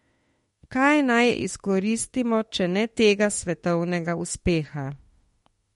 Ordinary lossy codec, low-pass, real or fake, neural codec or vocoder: MP3, 48 kbps; 19.8 kHz; fake; autoencoder, 48 kHz, 32 numbers a frame, DAC-VAE, trained on Japanese speech